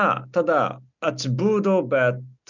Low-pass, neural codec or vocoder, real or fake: 7.2 kHz; none; real